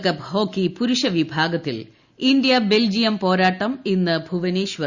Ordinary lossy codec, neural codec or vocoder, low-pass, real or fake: Opus, 64 kbps; none; 7.2 kHz; real